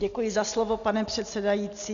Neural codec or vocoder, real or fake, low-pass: none; real; 7.2 kHz